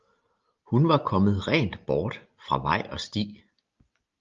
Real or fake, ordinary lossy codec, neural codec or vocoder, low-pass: real; Opus, 32 kbps; none; 7.2 kHz